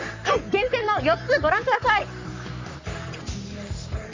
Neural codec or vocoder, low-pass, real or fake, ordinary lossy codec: codec, 44.1 kHz, 3.4 kbps, Pupu-Codec; 7.2 kHz; fake; MP3, 64 kbps